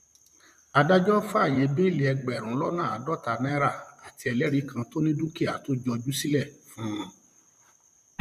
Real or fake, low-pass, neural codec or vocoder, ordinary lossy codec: fake; 14.4 kHz; vocoder, 44.1 kHz, 128 mel bands, Pupu-Vocoder; none